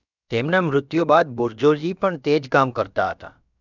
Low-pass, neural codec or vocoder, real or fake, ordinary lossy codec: 7.2 kHz; codec, 16 kHz, about 1 kbps, DyCAST, with the encoder's durations; fake; none